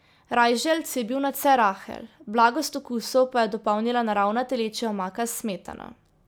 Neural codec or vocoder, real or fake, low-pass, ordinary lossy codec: none; real; none; none